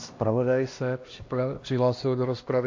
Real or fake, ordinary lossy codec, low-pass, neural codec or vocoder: fake; AAC, 48 kbps; 7.2 kHz; codec, 16 kHz, 1 kbps, X-Codec, HuBERT features, trained on LibriSpeech